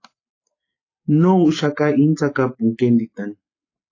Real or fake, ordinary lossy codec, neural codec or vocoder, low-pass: real; AAC, 32 kbps; none; 7.2 kHz